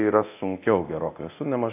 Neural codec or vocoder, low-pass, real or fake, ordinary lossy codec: codec, 24 kHz, 0.9 kbps, DualCodec; 3.6 kHz; fake; AAC, 24 kbps